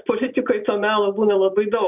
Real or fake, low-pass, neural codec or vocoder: real; 3.6 kHz; none